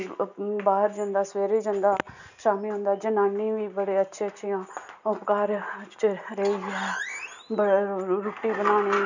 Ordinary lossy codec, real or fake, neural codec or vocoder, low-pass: none; real; none; 7.2 kHz